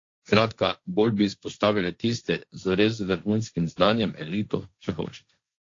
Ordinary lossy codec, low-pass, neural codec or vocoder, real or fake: AAC, 48 kbps; 7.2 kHz; codec, 16 kHz, 1.1 kbps, Voila-Tokenizer; fake